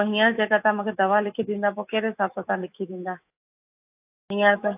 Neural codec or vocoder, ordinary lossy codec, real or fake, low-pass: autoencoder, 48 kHz, 128 numbers a frame, DAC-VAE, trained on Japanese speech; AAC, 32 kbps; fake; 3.6 kHz